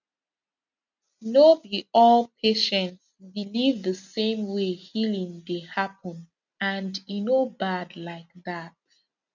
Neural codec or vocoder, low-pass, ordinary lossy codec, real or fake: none; 7.2 kHz; none; real